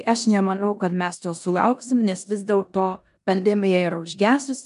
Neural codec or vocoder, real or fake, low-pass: codec, 16 kHz in and 24 kHz out, 0.9 kbps, LongCat-Audio-Codec, four codebook decoder; fake; 10.8 kHz